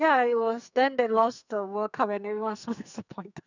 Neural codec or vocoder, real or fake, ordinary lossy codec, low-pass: codec, 44.1 kHz, 2.6 kbps, SNAC; fake; none; 7.2 kHz